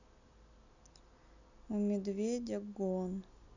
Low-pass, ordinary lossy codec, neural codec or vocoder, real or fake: 7.2 kHz; none; none; real